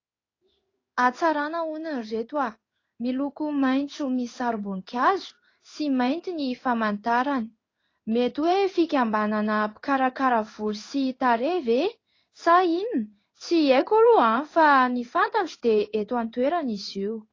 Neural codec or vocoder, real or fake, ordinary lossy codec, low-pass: codec, 16 kHz in and 24 kHz out, 1 kbps, XY-Tokenizer; fake; AAC, 32 kbps; 7.2 kHz